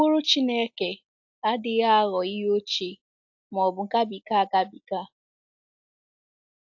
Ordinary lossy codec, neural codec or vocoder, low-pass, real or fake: AAC, 48 kbps; none; 7.2 kHz; real